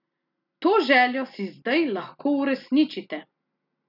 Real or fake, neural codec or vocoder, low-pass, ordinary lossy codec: real; none; 5.4 kHz; none